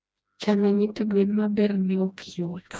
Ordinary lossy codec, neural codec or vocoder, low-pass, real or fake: none; codec, 16 kHz, 1 kbps, FreqCodec, smaller model; none; fake